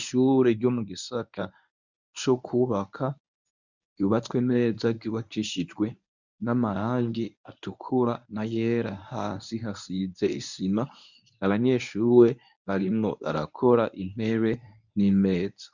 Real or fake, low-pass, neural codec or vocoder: fake; 7.2 kHz; codec, 24 kHz, 0.9 kbps, WavTokenizer, medium speech release version 1